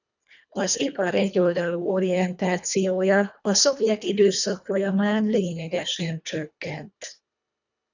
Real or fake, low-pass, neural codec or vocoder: fake; 7.2 kHz; codec, 24 kHz, 1.5 kbps, HILCodec